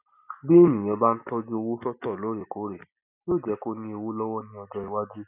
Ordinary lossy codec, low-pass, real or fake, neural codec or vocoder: none; 3.6 kHz; real; none